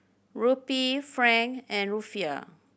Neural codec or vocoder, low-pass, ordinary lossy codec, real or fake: none; none; none; real